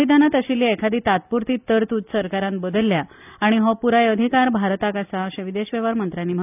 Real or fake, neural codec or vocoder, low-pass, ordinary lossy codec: real; none; 3.6 kHz; none